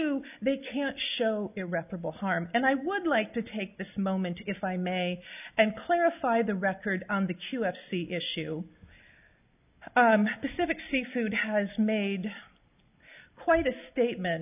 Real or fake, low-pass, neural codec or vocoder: real; 3.6 kHz; none